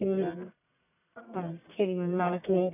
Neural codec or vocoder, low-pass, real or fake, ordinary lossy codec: codec, 44.1 kHz, 1.7 kbps, Pupu-Codec; 3.6 kHz; fake; none